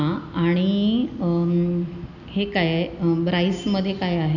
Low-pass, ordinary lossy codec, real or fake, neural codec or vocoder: 7.2 kHz; none; real; none